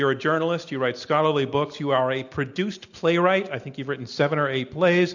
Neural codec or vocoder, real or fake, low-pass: none; real; 7.2 kHz